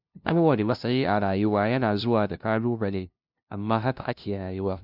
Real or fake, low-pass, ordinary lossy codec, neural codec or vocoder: fake; 5.4 kHz; none; codec, 16 kHz, 0.5 kbps, FunCodec, trained on LibriTTS, 25 frames a second